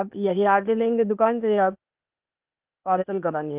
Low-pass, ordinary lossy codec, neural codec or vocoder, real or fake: 3.6 kHz; Opus, 24 kbps; codec, 16 kHz, 0.8 kbps, ZipCodec; fake